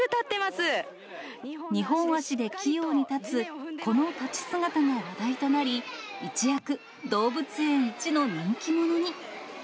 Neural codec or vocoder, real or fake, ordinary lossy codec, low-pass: none; real; none; none